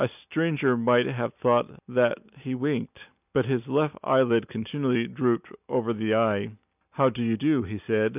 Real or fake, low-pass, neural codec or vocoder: real; 3.6 kHz; none